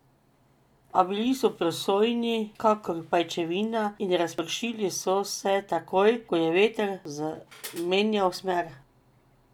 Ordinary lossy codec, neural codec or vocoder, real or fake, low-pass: none; none; real; 19.8 kHz